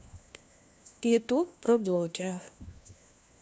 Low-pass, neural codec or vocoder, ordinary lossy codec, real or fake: none; codec, 16 kHz, 1 kbps, FunCodec, trained on LibriTTS, 50 frames a second; none; fake